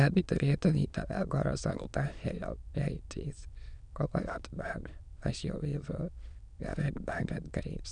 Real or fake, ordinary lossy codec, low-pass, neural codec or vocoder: fake; none; 9.9 kHz; autoencoder, 22.05 kHz, a latent of 192 numbers a frame, VITS, trained on many speakers